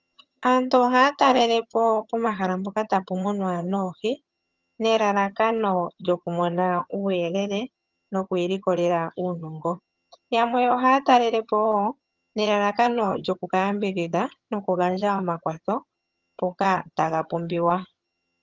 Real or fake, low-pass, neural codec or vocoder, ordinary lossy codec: fake; 7.2 kHz; vocoder, 22.05 kHz, 80 mel bands, HiFi-GAN; Opus, 32 kbps